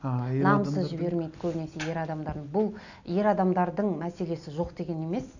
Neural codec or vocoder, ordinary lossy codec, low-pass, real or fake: none; none; 7.2 kHz; real